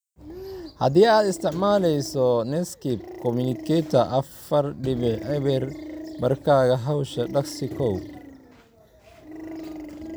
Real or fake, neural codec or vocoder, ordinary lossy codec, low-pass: real; none; none; none